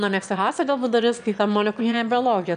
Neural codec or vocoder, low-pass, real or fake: autoencoder, 22.05 kHz, a latent of 192 numbers a frame, VITS, trained on one speaker; 9.9 kHz; fake